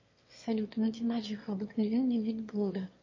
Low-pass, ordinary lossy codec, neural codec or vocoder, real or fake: 7.2 kHz; MP3, 32 kbps; autoencoder, 22.05 kHz, a latent of 192 numbers a frame, VITS, trained on one speaker; fake